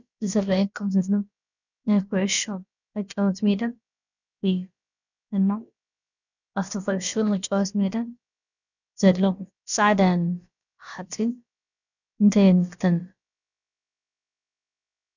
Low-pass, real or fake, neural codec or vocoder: 7.2 kHz; fake; codec, 16 kHz, about 1 kbps, DyCAST, with the encoder's durations